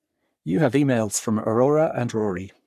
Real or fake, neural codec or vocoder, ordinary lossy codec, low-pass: fake; codec, 44.1 kHz, 3.4 kbps, Pupu-Codec; MP3, 96 kbps; 14.4 kHz